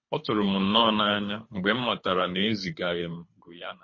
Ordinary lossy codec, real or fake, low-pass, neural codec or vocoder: MP3, 32 kbps; fake; 7.2 kHz; codec, 24 kHz, 3 kbps, HILCodec